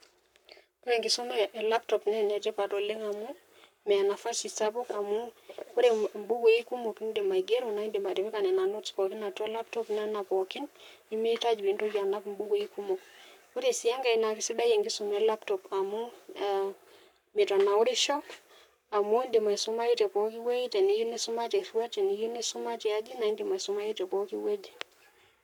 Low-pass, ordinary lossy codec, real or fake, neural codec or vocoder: 19.8 kHz; none; fake; codec, 44.1 kHz, 7.8 kbps, Pupu-Codec